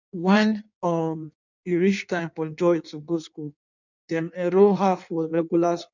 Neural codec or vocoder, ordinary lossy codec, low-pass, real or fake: codec, 16 kHz in and 24 kHz out, 1.1 kbps, FireRedTTS-2 codec; none; 7.2 kHz; fake